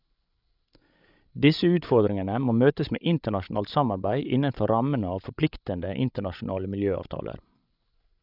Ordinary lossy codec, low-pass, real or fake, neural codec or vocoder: none; 5.4 kHz; fake; codec, 16 kHz, 16 kbps, FreqCodec, larger model